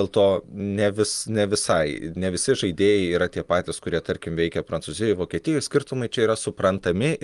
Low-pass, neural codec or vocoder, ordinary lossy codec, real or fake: 10.8 kHz; none; Opus, 32 kbps; real